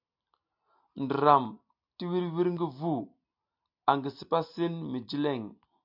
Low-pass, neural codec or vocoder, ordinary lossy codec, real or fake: 5.4 kHz; none; MP3, 48 kbps; real